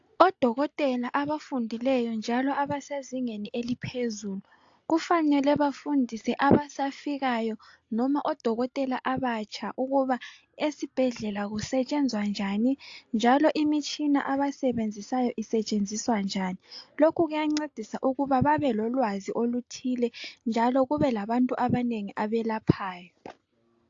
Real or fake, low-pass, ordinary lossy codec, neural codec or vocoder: real; 7.2 kHz; AAC, 48 kbps; none